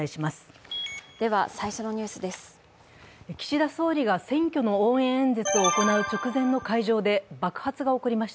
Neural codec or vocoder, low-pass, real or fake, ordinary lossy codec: none; none; real; none